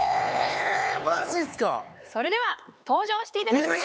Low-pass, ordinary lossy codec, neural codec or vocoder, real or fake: none; none; codec, 16 kHz, 4 kbps, X-Codec, HuBERT features, trained on LibriSpeech; fake